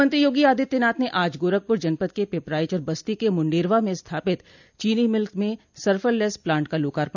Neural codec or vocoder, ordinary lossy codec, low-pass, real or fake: none; none; 7.2 kHz; real